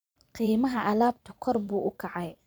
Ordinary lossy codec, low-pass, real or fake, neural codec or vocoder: none; none; fake; vocoder, 44.1 kHz, 128 mel bands every 512 samples, BigVGAN v2